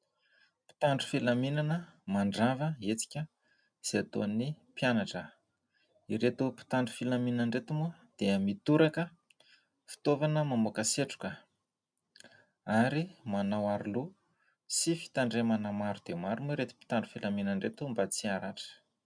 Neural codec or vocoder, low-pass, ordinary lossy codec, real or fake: none; 9.9 kHz; MP3, 96 kbps; real